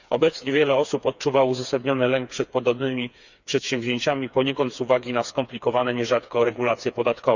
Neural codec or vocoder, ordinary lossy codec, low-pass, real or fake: codec, 16 kHz, 4 kbps, FreqCodec, smaller model; none; 7.2 kHz; fake